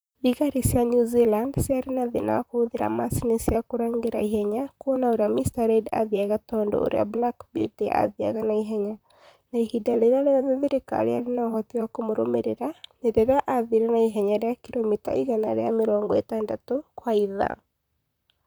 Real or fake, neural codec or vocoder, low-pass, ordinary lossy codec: fake; vocoder, 44.1 kHz, 128 mel bands, Pupu-Vocoder; none; none